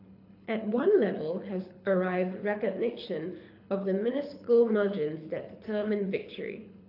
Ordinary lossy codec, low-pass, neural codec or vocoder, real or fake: AAC, 32 kbps; 5.4 kHz; codec, 24 kHz, 6 kbps, HILCodec; fake